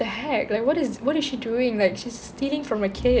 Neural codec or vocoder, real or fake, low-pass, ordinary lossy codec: none; real; none; none